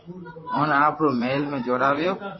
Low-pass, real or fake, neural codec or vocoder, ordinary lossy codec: 7.2 kHz; fake; vocoder, 44.1 kHz, 128 mel bands, Pupu-Vocoder; MP3, 24 kbps